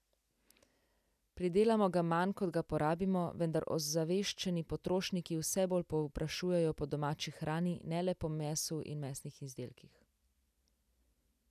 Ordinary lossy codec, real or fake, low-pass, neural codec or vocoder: none; real; 14.4 kHz; none